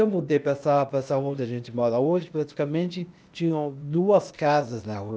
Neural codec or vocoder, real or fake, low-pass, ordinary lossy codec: codec, 16 kHz, 0.8 kbps, ZipCodec; fake; none; none